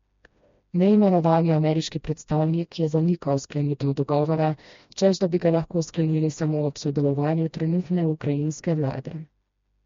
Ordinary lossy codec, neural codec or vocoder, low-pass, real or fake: MP3, 48 kbps; codec, 16 kHz, 1 kbps, FreqCodec, smaller model; 7.2 kHz; fake